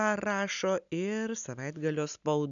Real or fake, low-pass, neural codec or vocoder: real; 7.2 kHz; none